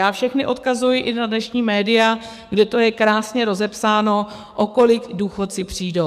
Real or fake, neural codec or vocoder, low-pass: fake; codec, 44.1 kHz, 7.8 kbps, DAC; 14.4 kHz